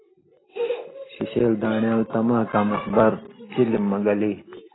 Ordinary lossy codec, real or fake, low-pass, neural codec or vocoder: AAC, 16 kbps; real; 7.2 kHz; none